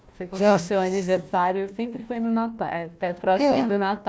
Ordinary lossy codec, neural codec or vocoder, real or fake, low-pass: none; codec, 16 kHz, 1 kbps, FunCodec, trained on Chinese and English, 50 frames a second; fake; none